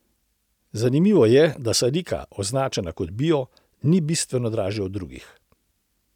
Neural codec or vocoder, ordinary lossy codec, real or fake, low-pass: none; none; real; 19.8 kHz